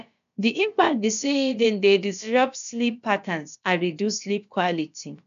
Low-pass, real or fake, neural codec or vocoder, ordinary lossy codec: 7.2 kHz; fake; codec, 16 kHz, about 1 kbps, DyCAST, with the encoder's durations; none